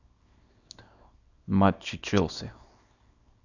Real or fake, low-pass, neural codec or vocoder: fake; 7.2 kHz; codec, 24 kHz, 0.9 kbps, WavTokenizer, small release